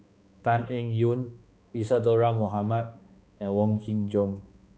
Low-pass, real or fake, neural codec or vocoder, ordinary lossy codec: none; fake; codec, 16 kHz, 2 kbps, X-Codec, HuBERT features, trained on balanced general audio; none